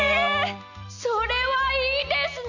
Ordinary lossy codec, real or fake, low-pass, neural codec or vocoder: none; real; 7.2 kHz; none